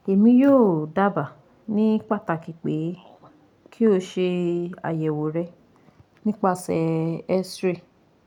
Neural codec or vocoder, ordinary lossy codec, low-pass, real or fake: none; none; 19.8 kHz; real